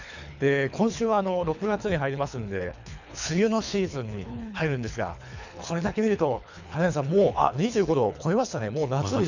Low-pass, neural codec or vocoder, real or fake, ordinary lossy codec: 7.2 kHz; codec, 24 kHz, 3 kbps, HILCodec; fake; none